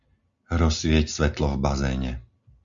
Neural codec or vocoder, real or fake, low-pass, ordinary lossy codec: none; real; 7.2 kHz; Opus, 64 kbps